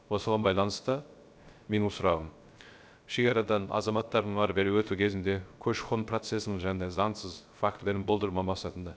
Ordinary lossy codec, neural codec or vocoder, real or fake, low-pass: none; codec, 16 kHz, 0.3 kbps, FocalCodec; fake; none